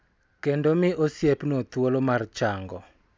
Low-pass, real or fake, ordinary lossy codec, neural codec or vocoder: none; real; none; none